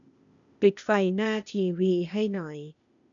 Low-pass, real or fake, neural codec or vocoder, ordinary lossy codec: 7.2 kHz; fake; codec, 16 kHz, 0.8 kbps, ZipCodec; none